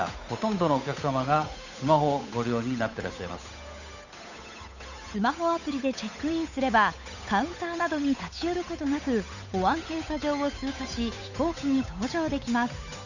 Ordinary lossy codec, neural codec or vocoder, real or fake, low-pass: none; codec, 16 kHz, 8 kbps, FunCodec, trained on Chinese and English, 25 frames a second; fake; 7.2 kHz